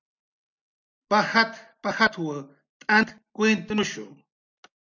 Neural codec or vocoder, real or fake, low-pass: none; real; 7.2 kHz